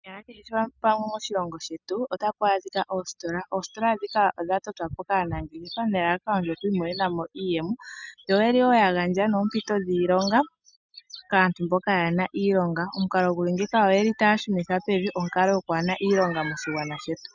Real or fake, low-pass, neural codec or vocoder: real; 7.2 kHz; none